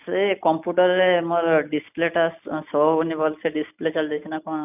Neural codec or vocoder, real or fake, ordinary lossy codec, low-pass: none; real; none; 3.6 kHz